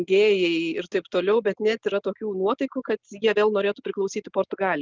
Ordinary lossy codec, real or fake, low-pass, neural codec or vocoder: Opus, 32 kbps; real; 7.2 kHz; none